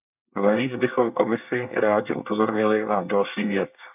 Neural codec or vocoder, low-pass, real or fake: codec, 24 kHz, 1 kbps, SNAC; 3.6 kHz; fake